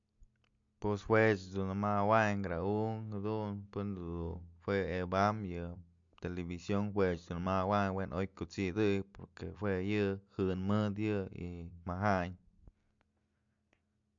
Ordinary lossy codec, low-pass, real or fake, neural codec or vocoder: MP3, 64 kbps; 7.2 kHz; real; none